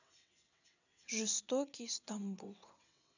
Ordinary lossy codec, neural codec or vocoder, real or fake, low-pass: none; vocoder, 22.05 kHz, 80 mel bands, WaveNeXt; fake; 7.2 kHz